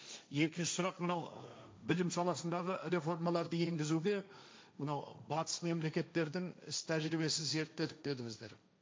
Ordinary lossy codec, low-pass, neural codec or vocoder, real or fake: none; none; codec, 16 kHz, 1.1 kbps, Voila-Tokenizer; fake